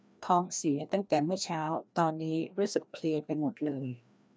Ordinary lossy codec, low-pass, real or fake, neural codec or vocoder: none; none; fake; codec, 16 kHz, 1 kbps, FreqCodec, larger model